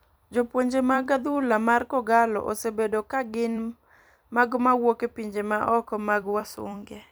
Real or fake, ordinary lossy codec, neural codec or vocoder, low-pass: fake; none; vocoder, 44.1 kHz, 128 mel bands every 256 samples, BigVGAN v2; none